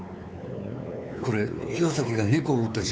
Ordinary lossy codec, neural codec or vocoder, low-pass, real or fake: none; codec, 16 kHz, 4 kbps, X-Codec, WavLM features, trained on Multilingual LibriSpeech; none; fake